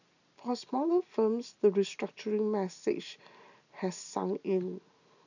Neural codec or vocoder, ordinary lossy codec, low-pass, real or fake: none; none; 7.2 kHz; real